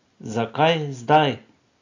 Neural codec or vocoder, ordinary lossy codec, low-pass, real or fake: none; AAC, 48 kbps; 7.2 kHz; real